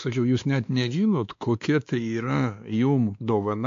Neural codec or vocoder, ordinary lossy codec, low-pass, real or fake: codec, 16 kHz, 2 kbps, X-Codec, WavLM features, trained on Multilingual LibriSpeech; AAC, 64 kbps; 7.2 kHz; fake